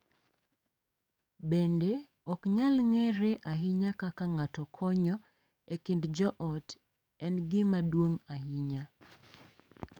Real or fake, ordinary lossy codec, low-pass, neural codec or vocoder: fake; none; 19.8 kHz; codec, 44.1 kHz, 7.8 kbps, DAC